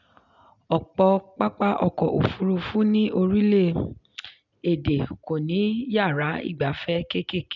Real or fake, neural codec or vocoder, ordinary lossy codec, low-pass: real; none; none; 7.2 kHz